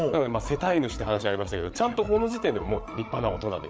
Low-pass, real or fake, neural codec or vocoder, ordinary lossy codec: none; fake; codec, 16 kHz, 8 kbps, FreqCodec, larger model; none